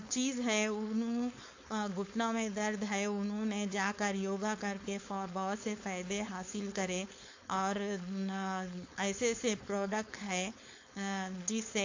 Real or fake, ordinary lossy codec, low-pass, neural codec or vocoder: fake; AAC, 48 kbps; 7.2 kHz; codec, 16 kHz, 4.8 kbps, FACodec